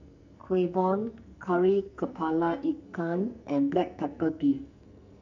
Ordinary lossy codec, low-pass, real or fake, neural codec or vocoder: none; 7.2 kHz; fake; codec, 44.1 kHz, 2.6 kbps, SNAC